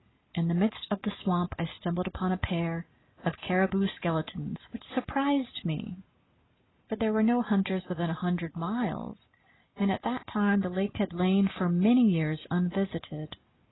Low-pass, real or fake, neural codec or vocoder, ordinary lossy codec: 7.2 kHz; real; none; AAC, 16 kbps